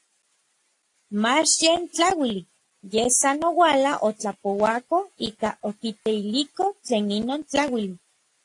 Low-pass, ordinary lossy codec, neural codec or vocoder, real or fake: 10.8 kHz; AAC, 48 kbps; none; real